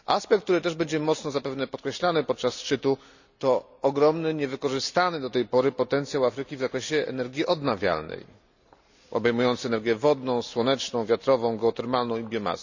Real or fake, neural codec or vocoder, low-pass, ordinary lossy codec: real; none; 7.2 kHz; none